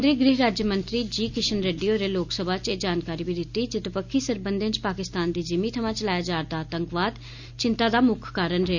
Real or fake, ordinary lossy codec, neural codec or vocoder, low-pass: real; none; none; 7.2 kHz